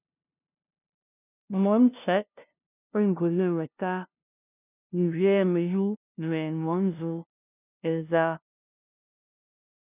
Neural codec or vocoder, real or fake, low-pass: codec, 16 kHz, 0.5 kbps, FunCodec, trained on LibriTTS, 25 frames a second; fake; 3.6 kHz